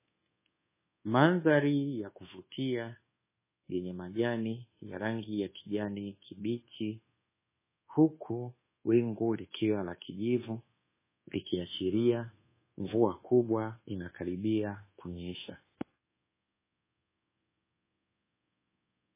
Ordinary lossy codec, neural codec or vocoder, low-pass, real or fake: MP3, 16 kbps; codec, 24 kHz, 1.2 kbps, DualCodec; 3.6 kHz; fake